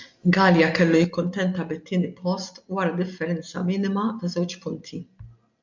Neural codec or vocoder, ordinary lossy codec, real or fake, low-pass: none; MP3, 64 kbps; real; 7.2 kHz